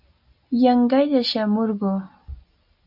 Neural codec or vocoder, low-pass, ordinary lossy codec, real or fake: none; 5.4 kHz; AAC, 48 kbps; real